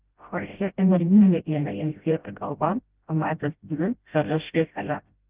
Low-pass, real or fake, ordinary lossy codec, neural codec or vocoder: 3.6 kHz; fake; Opus, 32 kbps; codec, 16 kHz, 0.5 kbps, FreqCodec, smaller model